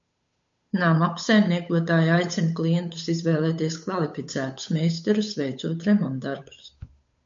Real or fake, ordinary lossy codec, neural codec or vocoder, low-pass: fake; MP3, 48 kbps; codec, 16 kHz, 8 kbps, FunCodec, trained on Chinese and English, 25 frames a second; 7.2 kHz